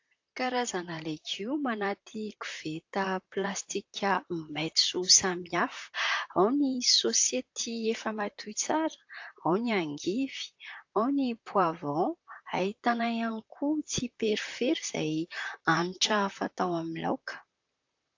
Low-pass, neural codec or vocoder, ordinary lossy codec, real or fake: 7.2 kHz; vocoder, 44.1 kHz, 128 mel bands, Pupu-Vocoder; AAC, 48 kbps; fake